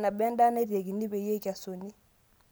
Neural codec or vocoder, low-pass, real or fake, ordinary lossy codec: none; none; real; none